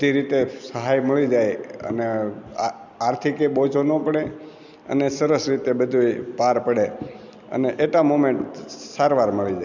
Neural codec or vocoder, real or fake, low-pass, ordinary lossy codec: none; real; 7.2 kHz; none